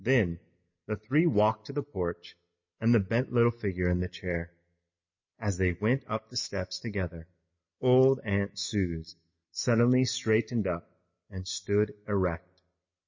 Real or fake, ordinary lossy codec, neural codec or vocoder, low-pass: real; MP3, 32 kbps; none; 7.2 kHz